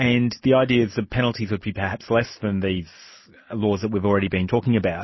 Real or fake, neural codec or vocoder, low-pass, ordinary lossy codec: real; none; 7.2 kHz; MP3, 24 kbps